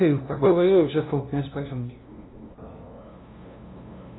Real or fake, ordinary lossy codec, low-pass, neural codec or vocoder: fake; AAC, 16 kbps; 7.2 kHz; codec, 16 kHz, 0.5 kbps, FunCodec, trained on LibriTTS, 25 frames a second